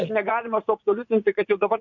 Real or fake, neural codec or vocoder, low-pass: fake; vocoder, 44.1 kHz, 80 mel bands, Vocos; 7.2 kHz